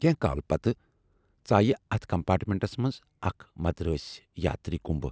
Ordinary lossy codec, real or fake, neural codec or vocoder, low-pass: none; real; none; none